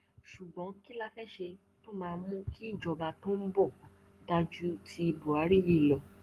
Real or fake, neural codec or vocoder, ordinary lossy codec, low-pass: fake; codec, 44.1 kHz, 7.8 kbps, Pupu-Codec; Opus, 32 kbps; 14.4 kHz